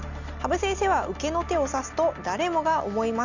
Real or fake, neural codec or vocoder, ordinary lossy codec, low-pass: real; none; none; 7.2 kHz